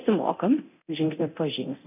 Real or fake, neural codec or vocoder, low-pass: fake; codec, 24 kHz, 0.9 kbps, DualCodec; 3.6 kHz